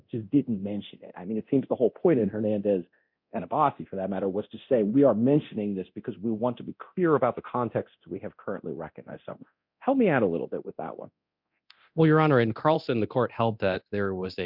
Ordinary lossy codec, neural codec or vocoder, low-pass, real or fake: MP3, 48 kbps; codec, 24 kHz, 0.9 kbps, DualCodec; 5.4 kHz; fake